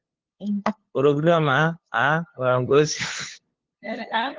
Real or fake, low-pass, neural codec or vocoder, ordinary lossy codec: fake; 7.2 kHz; codec, 16 kHz, 2 kbps, FunCodec, trained on LibriTTS, 25 frames a second; Opus, 16 kbps